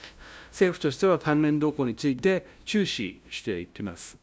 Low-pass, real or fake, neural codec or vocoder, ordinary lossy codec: none; fake; codec, 16 kHz, 0.5 kbps, FunCodec, trained on LibriTTS, 25 frames a second; none